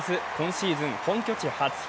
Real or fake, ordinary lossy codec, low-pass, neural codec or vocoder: real; none; none; none